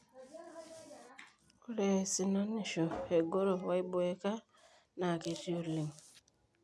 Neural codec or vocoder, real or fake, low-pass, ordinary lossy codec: none; real; none; none